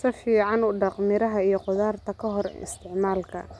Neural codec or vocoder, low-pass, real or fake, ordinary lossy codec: none; none; real; none